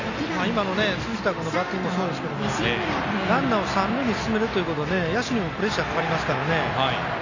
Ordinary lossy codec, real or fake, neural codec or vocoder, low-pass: none; real; none; 7.2 kHz